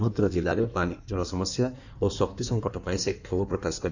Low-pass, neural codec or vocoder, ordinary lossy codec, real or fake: 7.2 kHz; codec, 16 kHz in and 24 kHz out, 1.1 kbps, FireRedTTS-2 codec; none; fake